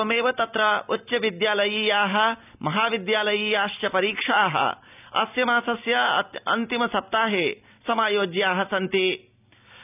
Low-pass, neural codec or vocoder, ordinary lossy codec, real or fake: 3.6 kHz; none; none; real